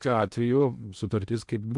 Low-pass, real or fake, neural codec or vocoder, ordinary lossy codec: 10.8 kHz; fake; codec, 16 kHz in and 24 kHz out, 0.6 kbps, FocalCodec, streaming, 2048 codes; MP3, 96 kbps